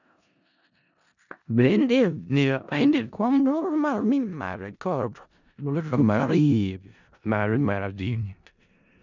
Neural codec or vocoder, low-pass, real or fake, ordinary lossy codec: codec, 16 kHz in and 24 kHz out, 0.4 kbps, LongCat-Audio-Codec, four codebook decoder; 7.2 kHz; fake; none